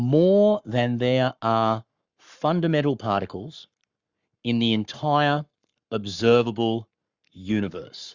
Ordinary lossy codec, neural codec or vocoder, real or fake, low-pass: Opus, 64 kbps; codec, 44.1 kHz, 7.8 kbps, Pupu-Codec; fake; 7.2 kHz